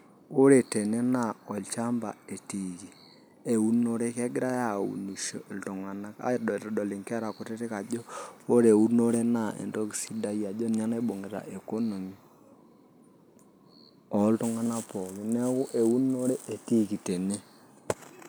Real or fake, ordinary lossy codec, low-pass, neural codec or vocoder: real; none; none; none